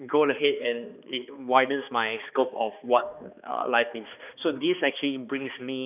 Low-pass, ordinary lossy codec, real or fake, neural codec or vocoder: 3.6 kHz; none; fake; codec, 16 kHz, 2 kbps, X-Codec, HuBERT features, trained on balanced general audio